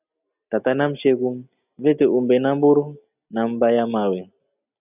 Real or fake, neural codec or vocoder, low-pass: real; none; 3.6 kHz